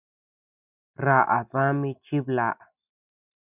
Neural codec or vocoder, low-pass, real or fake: none; 3.6 kHz; real